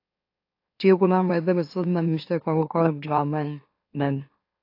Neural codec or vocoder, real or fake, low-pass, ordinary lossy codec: autoencoder, 44.1 kHz, a latent of 192 numbers a frame, MeloTTS; fake; 5.4 kHz; AAC, 32 kbps